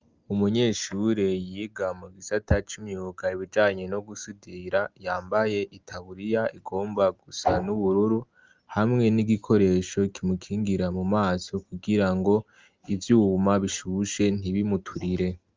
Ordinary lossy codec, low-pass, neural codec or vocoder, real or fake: Opus, 24 kbps; 7.2 kHz; none; real